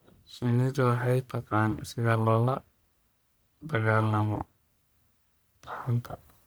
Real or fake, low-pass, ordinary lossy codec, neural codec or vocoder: fake; none; none; codec, 44.1 kHz, 1.7 kbps, Pupu-Codec